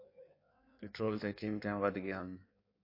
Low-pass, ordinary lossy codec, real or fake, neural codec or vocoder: 5.4 kHz; MP3, 32 kbps; fake; codec, 16 kHz in and 24 kHz out, 1.1 kbps, FireRedTTS-2 codec